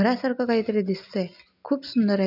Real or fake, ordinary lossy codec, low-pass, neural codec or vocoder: real; none; 5.4 kHz; none